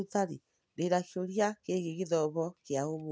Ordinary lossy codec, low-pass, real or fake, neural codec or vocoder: none; none; real; none